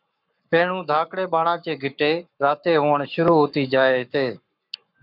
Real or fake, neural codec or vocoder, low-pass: fake; codec, 44.1 kHz, 7.8 kbps, Pupu-Codec; 5.4 kHz